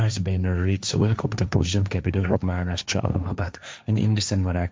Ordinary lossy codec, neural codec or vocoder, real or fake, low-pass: none; codec, 16 kHz, 1.1 kbps, Voila-Tokenizer; fake; none